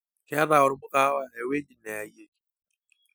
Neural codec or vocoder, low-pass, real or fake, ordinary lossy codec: none; none; real; none